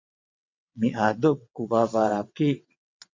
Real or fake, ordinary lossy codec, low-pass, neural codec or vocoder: fake; MP3, 48 kbps; 7.2 kHz; vocoder, 22.05 kHz, 80 mel bands, WaveNeXt